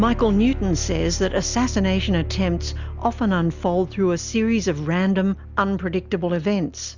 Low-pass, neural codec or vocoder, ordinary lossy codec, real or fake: 7.2 kHz; none; Opus, 64 kbps; real